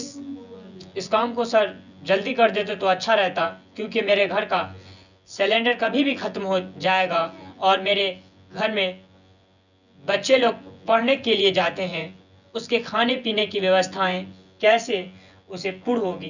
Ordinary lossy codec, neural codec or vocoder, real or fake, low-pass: none; vocoder, 24 kHz, 100 mel bands, Vocos; fake; 7.2 kHz